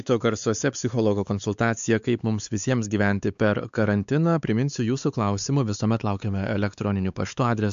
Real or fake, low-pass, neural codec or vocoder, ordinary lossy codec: fake; 7.2 kHz; codec, 16 kHz, 4 kbps, X-Codec, WavLM features, trained on Multilingual LibriSpeech; AAC, 96 kbps